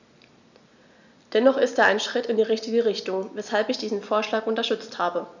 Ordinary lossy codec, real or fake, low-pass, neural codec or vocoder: none; real; 7.2 kHz; none